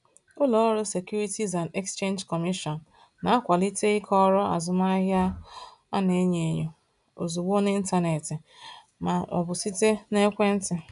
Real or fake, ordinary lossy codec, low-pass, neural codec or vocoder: real; none; 10.8 kHz; none